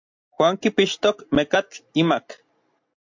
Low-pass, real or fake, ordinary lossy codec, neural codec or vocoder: 7.2 kHz; real; MP3, 48 kbps; none